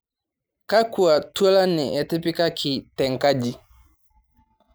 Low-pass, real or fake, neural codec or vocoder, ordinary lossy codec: none; real; none; none